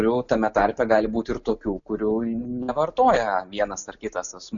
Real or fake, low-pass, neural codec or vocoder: real; 7.2 kHz; none